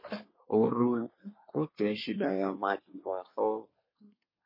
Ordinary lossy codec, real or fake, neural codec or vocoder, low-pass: MP3, 24 kbps; fake; codec, 24 kHz, 1 kbps, SNAC; 5.4 kHz